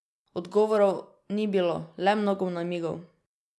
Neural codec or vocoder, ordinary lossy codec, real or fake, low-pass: none; none; real; none